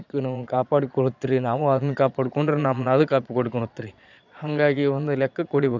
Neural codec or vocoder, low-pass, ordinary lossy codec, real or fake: vocoder, 22.05 kHz, 80 mel bands, Vocos; 7.2 kHz; none; fake